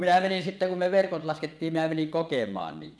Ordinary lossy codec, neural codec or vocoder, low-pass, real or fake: none; vocoder, 22.05 kHz, 80 mel bands, WaveNeXt; none; fake